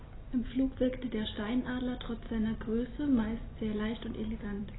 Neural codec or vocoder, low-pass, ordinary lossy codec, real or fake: none; 7.2 kHz; AAC, 16 kbps; real